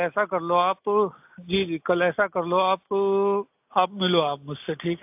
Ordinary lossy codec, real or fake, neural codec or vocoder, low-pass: AAC, 32 kbps; real; none; 3.6 kHz